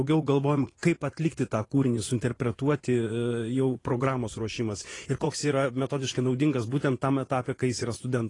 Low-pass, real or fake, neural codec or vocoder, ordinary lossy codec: 10.8 kHz; fake; vocoder, 24 kHz, 100 mel bands, Vocos; AAC, 32 kbps